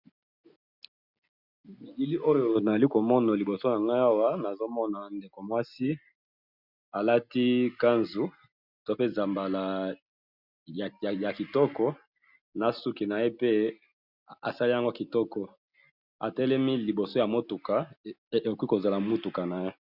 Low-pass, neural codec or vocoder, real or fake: 5.4 kHz; none; real